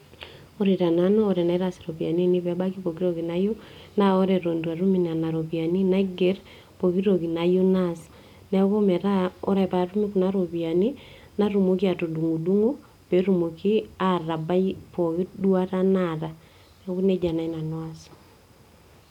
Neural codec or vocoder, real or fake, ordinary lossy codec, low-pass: none; real; none; 19.8 kHz